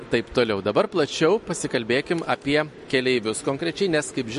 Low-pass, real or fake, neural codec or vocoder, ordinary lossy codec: 14.4 kHz; real; none; MP3, 48 kbps